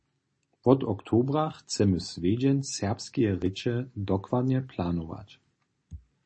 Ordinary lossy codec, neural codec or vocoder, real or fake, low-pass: MP3, 32 kbps; none; real; 9.9 kHz